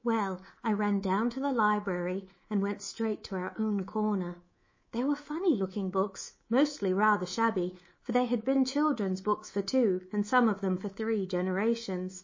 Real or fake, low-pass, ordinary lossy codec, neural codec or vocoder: fake; 7.2 kHz; MP3, 32 kbps; codec, 24 kHz, 3.1 kbps, DualCodec